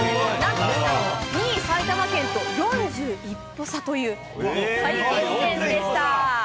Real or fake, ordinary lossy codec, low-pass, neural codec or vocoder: real; none; none; none